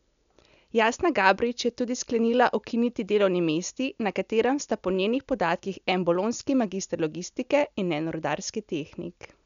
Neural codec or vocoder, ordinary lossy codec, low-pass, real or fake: none; none; 7.2 kHz; real